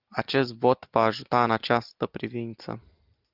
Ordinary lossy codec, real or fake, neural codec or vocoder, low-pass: Opus, 32 kbps; real; none; 5.4 kHz